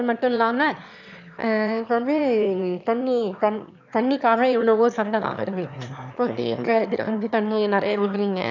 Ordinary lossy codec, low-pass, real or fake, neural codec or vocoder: none; 7.2 kHz; fake; autoencoder, 22.05 kHz, a latent of 192 numbers a frame, VITS, trained on one speaker